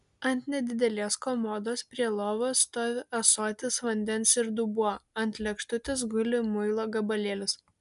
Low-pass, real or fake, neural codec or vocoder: 10.8 kHz; real; none